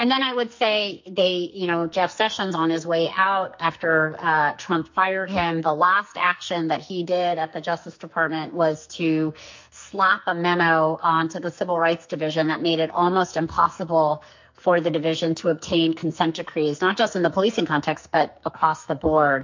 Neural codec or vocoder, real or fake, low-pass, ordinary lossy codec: codec, 44.1 kHz, 2.6 kbps, SNAC; fake; 7.2 kHz; MP3, 48 kbps